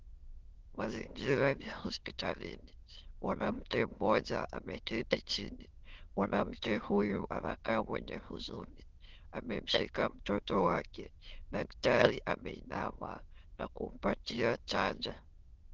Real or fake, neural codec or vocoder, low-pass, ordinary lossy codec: fake; autoencoder, 22.05 kHz, a latent of 192 numbers a frame, VITS, trained on many speakers; 7.2 kHz; Opus, 16 kbps